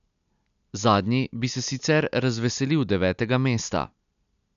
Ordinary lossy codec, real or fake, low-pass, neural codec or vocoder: none; real; 7.2 kHz; none